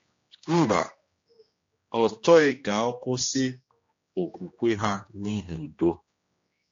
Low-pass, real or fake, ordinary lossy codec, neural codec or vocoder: 7.2 kHz; fake; AAC, 48 kbps; codec, 16 kHz, 1 kbps, X-Codec, HuBERT features, trained on general audio